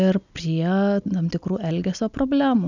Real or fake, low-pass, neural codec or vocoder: real; 7.2 kHz; none